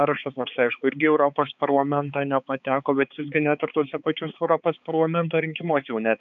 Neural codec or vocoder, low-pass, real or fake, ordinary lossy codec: codec, 16 kHz, 4 kbps, X-Codec, HuBERT features, trained on balanced general audio; 7.2 kHz; fake; MP3, 64 kbps